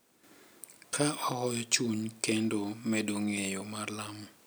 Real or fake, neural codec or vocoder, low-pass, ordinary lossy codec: real; none; none; none